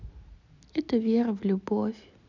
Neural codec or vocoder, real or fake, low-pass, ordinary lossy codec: none; real; 7.2 kHz; none